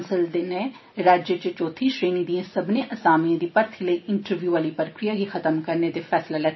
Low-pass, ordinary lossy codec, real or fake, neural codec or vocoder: 7.2 kHz; MP3, 24 kbps; real; none